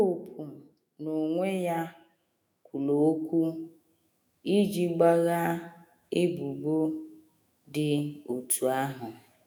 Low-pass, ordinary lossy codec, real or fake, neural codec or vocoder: none; none; fake; autoencoder, 48 kHz, 128 numbers a frame, DAC-VAE, trained on Japanese speech